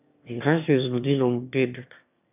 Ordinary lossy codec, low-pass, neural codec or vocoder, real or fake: none; 3.6 kHz; autoencoder, 22.05 kHz, a latent of 192 numbers a frame, VITS, trained on one speaker; fake